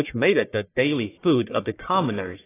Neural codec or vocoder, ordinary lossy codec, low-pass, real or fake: codec, 44.1 kHz, 1.7 kbps, Pupu-Codec; AAC, 16 kbps; 3.6 kHz; fake